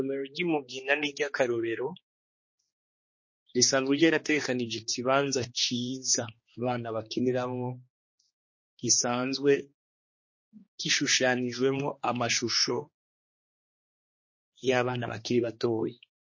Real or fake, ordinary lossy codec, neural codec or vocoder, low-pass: fake; MP3, 32 kbps; codec, 16 kHz, 2 kbps, X-Codec, HuBERT features, trained on general audio; 7.2 kHz